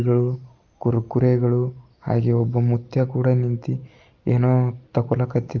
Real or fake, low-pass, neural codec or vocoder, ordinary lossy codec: real; 7.2 kHz; none; Opus, 24 kbps